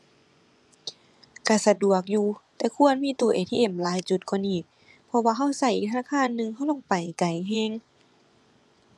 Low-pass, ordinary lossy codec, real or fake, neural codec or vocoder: none; none; fake; vocoder, 24 kHz, 100 mel bands, Vocos